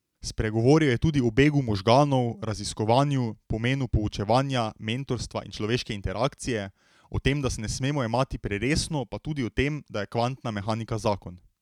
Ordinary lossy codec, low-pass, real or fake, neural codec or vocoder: none; 19.8 kHz; real; none